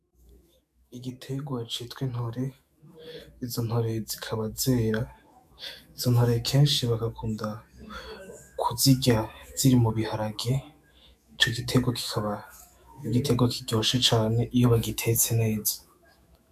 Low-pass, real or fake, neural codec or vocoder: 14.4 kHz; fake; autoencoder, 48 kHz, 128 numbers a frame, DAC-VAE, trained on Japanese speech